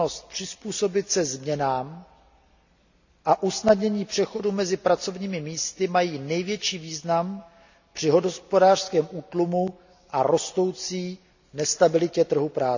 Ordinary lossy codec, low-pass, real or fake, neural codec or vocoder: none; 7.2 kHz; real; none